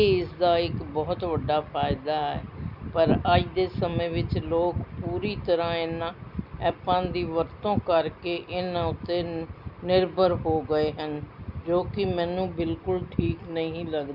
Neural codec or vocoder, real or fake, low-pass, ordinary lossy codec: none; real; 5.4 kHz; none